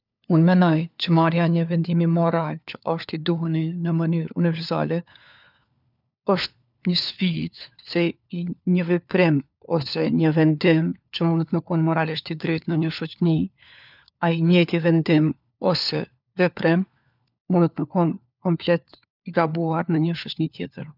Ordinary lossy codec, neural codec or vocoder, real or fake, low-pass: none; codec, 16 kHz, 4 kbps, FunCodec, trained on LibriTTS, 50 frames a second; fake; 5.4 kHz